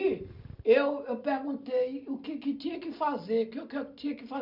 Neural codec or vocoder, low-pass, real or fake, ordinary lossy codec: none; 5.4 kHz; real; none